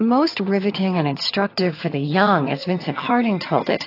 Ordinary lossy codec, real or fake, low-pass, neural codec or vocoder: AAC, 32 kbps; fake; 5.4 kHz; vocoder, 22.05 kHz, 80 mel bands, HiFi-GAN